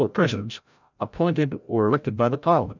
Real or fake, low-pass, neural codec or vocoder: fake; 7.2 kHz; codec, 16 kHz, 0.5 kbps, FreqCodec, larger model